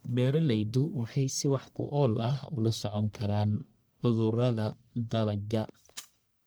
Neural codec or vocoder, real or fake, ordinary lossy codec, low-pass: codec, 44.1 kHz, 1.7 kbps, Pupu-Codec; fake; none; none